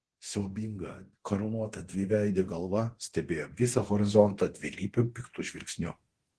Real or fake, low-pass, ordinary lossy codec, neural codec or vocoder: fake; 10.8 kHz; Opus, 16 kbps; codec, 24 kHz, 0.9 kbps, DualCodec